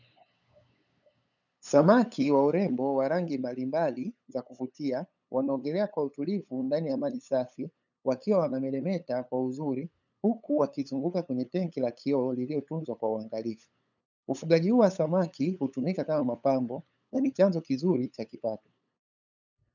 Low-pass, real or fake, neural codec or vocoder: 7.2 kHz; fake; codec, 16 kHz, 8 kbps, FunCodec, trained on LibriTTS, 25 frames a second